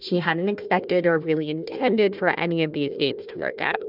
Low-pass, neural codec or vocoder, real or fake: 5.4 kHz; codec, 16 kHz, 1 kbps, FunCodec, trained on Chinese and English, 50 frames a second; fake